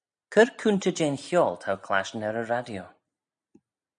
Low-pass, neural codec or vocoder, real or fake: 9.9 kHz; none; real